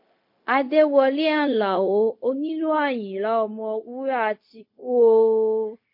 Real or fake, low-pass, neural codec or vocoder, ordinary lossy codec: fake; 5.4 kHz; codec, 16 kHz, 0.4 kbps, LongCat-Audio-Codec; MP3, 32 kbps